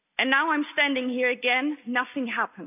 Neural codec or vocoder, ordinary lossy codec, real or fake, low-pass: none; none; real; 3.6 kHz